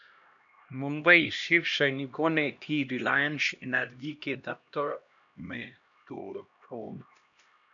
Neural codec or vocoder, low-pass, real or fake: codec, 16 kHz, 1 kbps, X-Codec, HuBERT features, trained on LibriSpeech; 7.2 kHz; fake